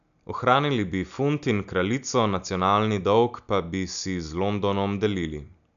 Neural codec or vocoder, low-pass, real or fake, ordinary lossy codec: none; 7.2 kHz; real; none